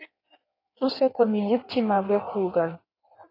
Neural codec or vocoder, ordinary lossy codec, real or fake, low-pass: codec, 16 kHz in and 24 kHz out, 1.1 kbps, FireRedTTS-2 codec; AAC, 24 kbps; fake; 5.4 kHz